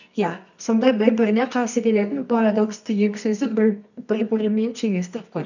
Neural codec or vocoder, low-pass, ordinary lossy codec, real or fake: codec, 24 kHz, 0.9 kbps, WavTokenizer, medium music audio release; 7.2 kHz; none; fake